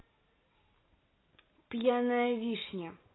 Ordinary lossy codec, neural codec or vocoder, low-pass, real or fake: AAC, 16 kbps; none; 7.2 kHz; real